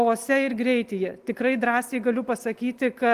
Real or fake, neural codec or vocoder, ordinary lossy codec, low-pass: real; none; Opus, 24 kbps; 14.4 kHz